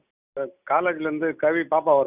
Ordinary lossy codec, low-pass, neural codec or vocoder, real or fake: none; 3.6 kHz; none; real